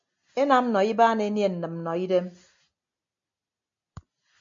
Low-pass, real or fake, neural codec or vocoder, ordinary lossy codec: 7.2 kHz; real; none; MP3, 48 kbps